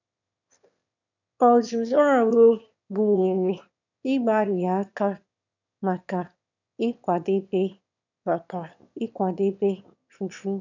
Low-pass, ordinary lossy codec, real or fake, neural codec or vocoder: 7.2 kHz; none; fake; autoencoder, 22.05 kHz, a latent of 192 numbers a frame, VITS, trained on one speaker